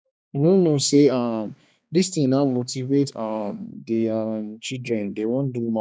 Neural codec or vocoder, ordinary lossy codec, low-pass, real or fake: codec, 16 kHz, 2 kbps, X-Codec, HuBERT features, trained on balanced general audio; none; none; fake